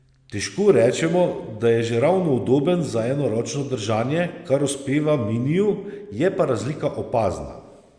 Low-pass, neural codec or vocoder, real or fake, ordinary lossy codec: 9.9 kHz; none; real; Opus, 64 kbps